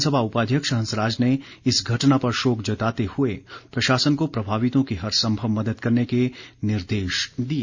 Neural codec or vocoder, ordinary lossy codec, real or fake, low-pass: none; Opus, 64 kbps; real; 7.2 kHz